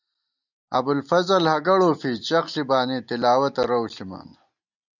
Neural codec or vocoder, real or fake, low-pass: none; real; 7.2 kHz